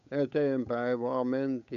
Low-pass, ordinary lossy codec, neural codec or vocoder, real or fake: 7.2 kHz; none; codec, 16 kHz, 8 kbps, FunCodec, trained on Chinese and English, 25 frames a second; fake